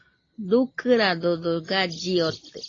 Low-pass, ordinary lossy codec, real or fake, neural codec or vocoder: 7.2 kHz; AAC, 32 kbps; real; none